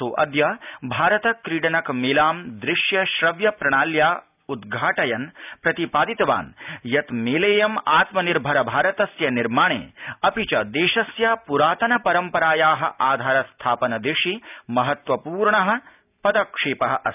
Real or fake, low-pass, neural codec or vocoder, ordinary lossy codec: real; 3.6 kHz; none; none